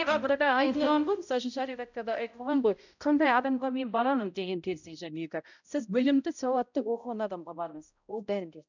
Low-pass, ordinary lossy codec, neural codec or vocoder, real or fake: 7.2 kHz; none; codec, 16 kHz, 0.5 kbps, X-Codec, HuBERT features, trained on balanced general audio; fake